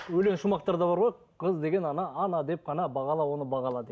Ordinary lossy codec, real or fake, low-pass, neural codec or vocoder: none; real; none; none